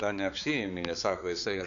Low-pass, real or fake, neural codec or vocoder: 7.2 kHz; fake; codec, 16 kHz, 4 kbps, X-Codec, HuBERT features, trained on balanced general audio